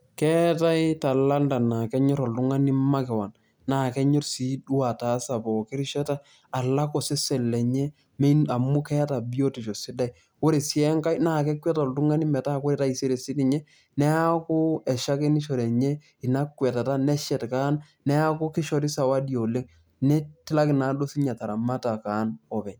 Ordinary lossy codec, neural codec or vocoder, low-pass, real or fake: none; none; none; real